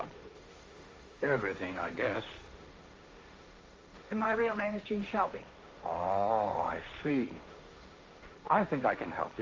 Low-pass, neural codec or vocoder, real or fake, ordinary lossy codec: 7.2 kHz; codec, 16 kHz, 1.1 kbps, Voila-Tokenizer; fake; Opus, 32 kbps